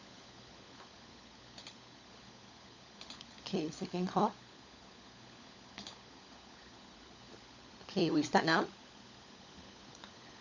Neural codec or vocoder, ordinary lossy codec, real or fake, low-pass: codec, 16 kHz, 16 kbps, FunCodec, trained on LibriTTS, 50 frames a second; Opus, 64 kbps; fake; 7.2 kHz